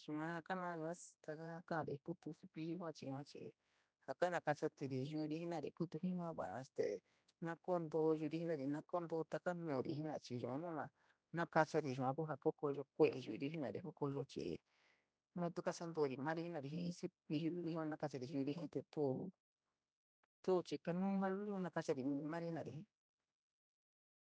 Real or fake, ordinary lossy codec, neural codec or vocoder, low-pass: fake; none; codec, 16 kHz, 1 kbps, X-Codec, HuBERT features, trained on general audio; none